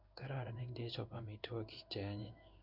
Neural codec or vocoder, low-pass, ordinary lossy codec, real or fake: codec, 16 kHz in and 24 kHz out, 1 kbps, XY-Tokenizer; 5.4 kHz; none; fake